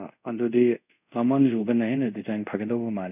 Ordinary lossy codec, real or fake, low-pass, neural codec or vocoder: none; fake; 3.6 kHz; codec, 24 kHz, 0.5 kbps, DualCodec